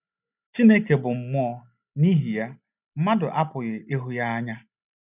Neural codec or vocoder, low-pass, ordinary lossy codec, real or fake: none; 3.6 kHz; none; real